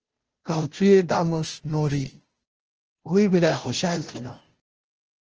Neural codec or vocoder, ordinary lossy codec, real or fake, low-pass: codec, 16 kHz, 0.5 kbps, FunCodec, trained on Chinese and English, 25 frames a second; Opus, 16 kbps; fake; 7.2 kHz